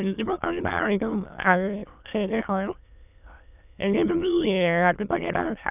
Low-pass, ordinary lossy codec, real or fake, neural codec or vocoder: 3.6 kHz; none; fake; autoencoder, 22.05 kHz, a latent of 192 numbers a frame, VITS, trained on many speakers